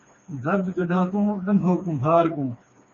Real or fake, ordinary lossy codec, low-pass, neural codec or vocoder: fake; MP3, 32 kbps; 7.2 kHz; codec, 16 kHz, 2 kbps, FreqCodec, smaller model